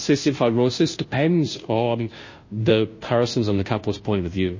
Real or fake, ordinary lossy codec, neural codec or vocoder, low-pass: fake; MP3, 32 kbps; codec, 16 kHz, 0.5 kbps, FunCodec, trained on Chinese and English, 25 frames a second; 7.2 kHz